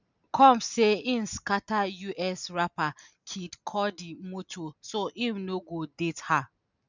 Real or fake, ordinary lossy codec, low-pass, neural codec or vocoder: real; none; 7.2 kHz; none